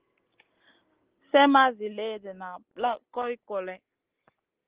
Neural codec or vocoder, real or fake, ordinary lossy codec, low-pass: none; real; Opus, 16 kbps; 3.6 kHz